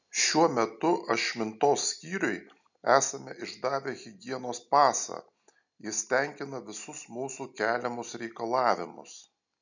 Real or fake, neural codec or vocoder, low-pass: real; none; 7.2 kHz